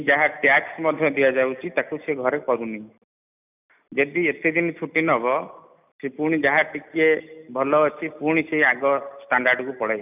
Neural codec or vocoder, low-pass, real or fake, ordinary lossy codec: none; 3.6 kHz; real; none